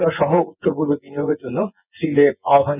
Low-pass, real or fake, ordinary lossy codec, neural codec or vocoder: 3.6 kHz; fake; none; vocoder, 24 kHz, 100 mel bands, Vocos